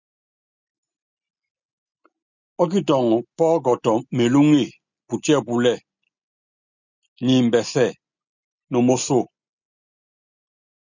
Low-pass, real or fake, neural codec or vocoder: 7.2 kHz; real; none